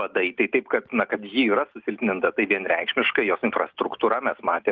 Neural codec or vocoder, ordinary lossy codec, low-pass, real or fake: none; Opus, 32 kbps; 7.2 kHz; real